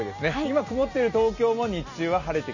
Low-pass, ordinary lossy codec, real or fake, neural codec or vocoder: 7.2 kHz; none; real; none